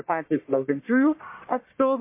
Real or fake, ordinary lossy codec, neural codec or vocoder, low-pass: fake; MP3, 24 kbps; codec, 44.1 kHz, 1.7 kbps, Pupu-Codec; 3.6 kHz